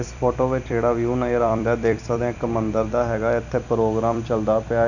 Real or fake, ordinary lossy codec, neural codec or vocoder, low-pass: fake; none; vocoder, 44.1 kHz, 128 mel bands every 256 samples, BigVGAN v2; 7.2 kHz